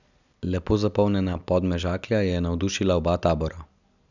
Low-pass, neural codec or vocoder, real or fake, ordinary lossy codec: 7.2 kHz; none; real; none